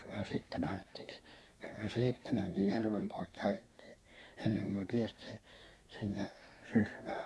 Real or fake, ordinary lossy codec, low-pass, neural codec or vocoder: fake; none; none; codec, 24 kHz, 1 kbps, SNAC